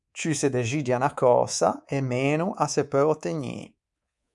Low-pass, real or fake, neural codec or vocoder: 10.8 kHz; fake; codec, 24 kHz, 3.1 kbps, DualCodec